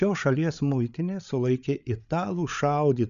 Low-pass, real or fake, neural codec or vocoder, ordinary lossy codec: 7.2 kHz; fake; codec, 16 kHz, 8 kbps, FreqCodec, larger model; MP3, 96 kbps